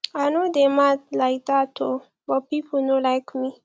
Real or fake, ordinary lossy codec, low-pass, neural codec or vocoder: real; none; none; none